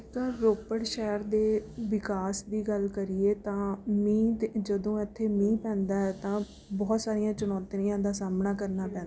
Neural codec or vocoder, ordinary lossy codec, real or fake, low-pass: none; none; real; none